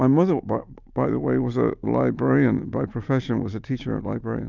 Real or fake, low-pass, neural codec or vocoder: real; 7.2 kHz; none